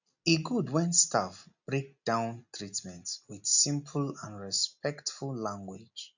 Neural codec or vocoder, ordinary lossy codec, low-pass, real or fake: none; none; 7.2 kHz; real